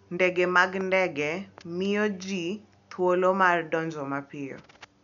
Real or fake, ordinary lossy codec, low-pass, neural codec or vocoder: real; none; 7.2 kHz; none